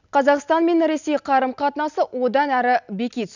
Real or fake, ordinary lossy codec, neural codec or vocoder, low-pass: real; none; none; 7.2 kHz